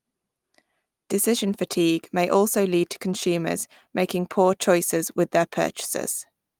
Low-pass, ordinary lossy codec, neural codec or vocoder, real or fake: 19.8 kHz; Opus, 32 kbps; none; real